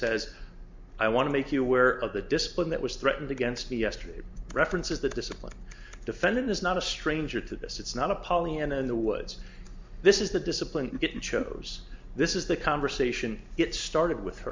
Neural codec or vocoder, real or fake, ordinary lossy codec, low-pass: none; real; MP3, 64 kbps; 7.2 kHz